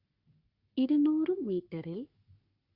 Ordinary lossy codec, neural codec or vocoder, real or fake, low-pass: Opus, 64 kbps; codec, 44.1 kHz, 3.4 kbps, Pupu-Codec; fake; 5.4 kHz